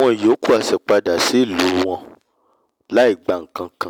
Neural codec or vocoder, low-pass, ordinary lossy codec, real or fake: none; 19.8 kHz; none; real